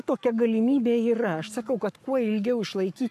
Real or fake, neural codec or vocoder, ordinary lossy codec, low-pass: fake; codec, 44.1 kHz, 7.8 kbps, Pupu-Codec; AAC, 96 kbps; 14.4 kHz